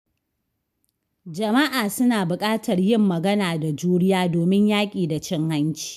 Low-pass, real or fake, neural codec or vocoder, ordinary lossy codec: 14.4 kHz; real; none; MP3, 96 kbps